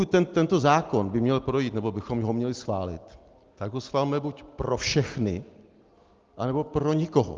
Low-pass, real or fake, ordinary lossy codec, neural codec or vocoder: 7.2 kHz; real; Opus, 32 kbps; none